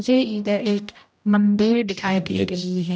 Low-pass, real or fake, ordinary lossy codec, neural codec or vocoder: none; fake; none; codec, 16 kHz, 0.5 kbps, X-Codec, HuBERT features, trained on general audio